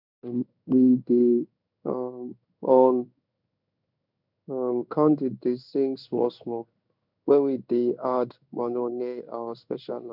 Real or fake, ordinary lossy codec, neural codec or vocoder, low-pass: fake; none; codec, 16 kHz in and 24 kHz out, 1 kbps, XY-Tokenizer; 5.4 kHz